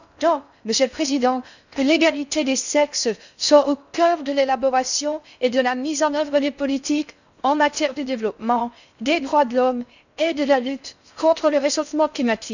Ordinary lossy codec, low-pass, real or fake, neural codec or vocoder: none; 7.2 kHz; fake; codec, 16 kHz in and 24 kHz out, 0.6 kbps, FocalCodec, streaming, 4096 codes